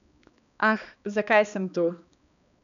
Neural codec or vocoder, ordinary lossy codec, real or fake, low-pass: codec, 16 kHz, 2 kbps, X-Codec, HuBERT features, trained on balanced general audio; none; fake; 7.2 kHz